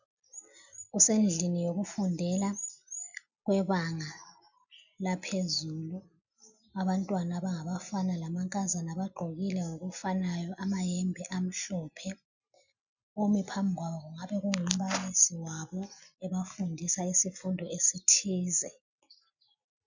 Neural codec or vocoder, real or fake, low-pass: none; real; 7.2 kHz